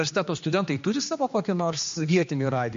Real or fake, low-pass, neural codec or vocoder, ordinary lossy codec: fake; 7.2 kHz; codec, 16 kHz, 2 kbps, X-Codec, HuBERT features, trained on general audio; MP3, 48 kbps